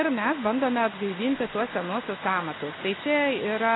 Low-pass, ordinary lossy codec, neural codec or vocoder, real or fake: 7.2 kHz; AAC, 16 kbps; none; real